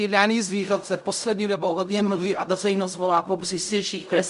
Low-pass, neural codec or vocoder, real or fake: 10.8 kHz; codec, 16 kHz in and 24 kHz out, 0.4 kbps, LongCat-Audio-Codec, fine tuned four codebook decoder; fake